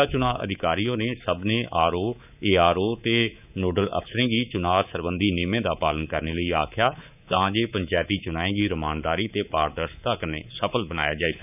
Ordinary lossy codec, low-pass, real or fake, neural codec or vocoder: none; 3.6 kHz; fake; codec, 24 kHz, 3.1 kbps, DualCodec